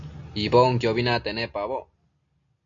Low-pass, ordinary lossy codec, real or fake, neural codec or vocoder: 7.2 kHz; MP3, 48 kbps; real; none